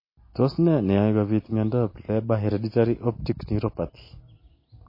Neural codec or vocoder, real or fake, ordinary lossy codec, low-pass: none; real; MP3, 24 kbps; 5.4 kHz